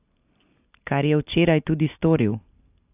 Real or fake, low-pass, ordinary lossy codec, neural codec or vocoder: real; 3.6 kHz; AAC, 32 kbps; none